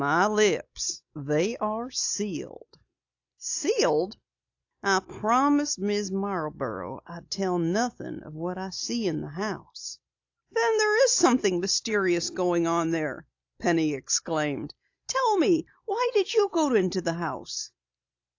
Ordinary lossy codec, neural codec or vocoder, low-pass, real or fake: AAC, 48 kbps; none; 7.2 kHz; real